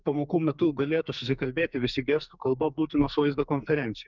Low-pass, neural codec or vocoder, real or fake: 7.2 kHz; codec, 44.1 kHz, 2.6 kbps, SNAC; fake